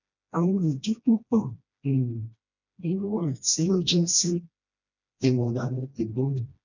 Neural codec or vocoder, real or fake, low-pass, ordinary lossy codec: codec, 16 kHz, 1 kbps, FreqCodec, smaller model; fake; 7.2 kHz; none